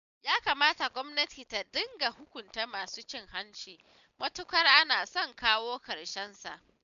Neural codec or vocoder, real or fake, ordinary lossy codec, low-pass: none; real; MP3, 96 kbps; 7.2 kHz